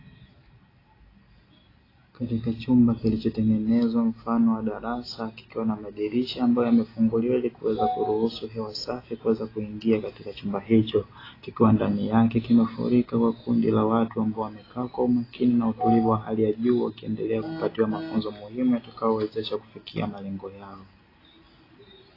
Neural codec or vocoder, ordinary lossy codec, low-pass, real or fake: none; AAC, 24 kbps; 5.4 kHz; real